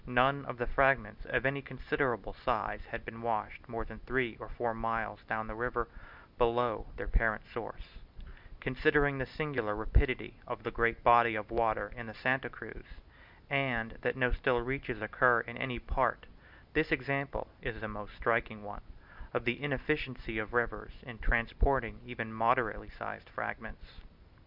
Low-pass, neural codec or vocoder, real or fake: 5.4 kHz; none; real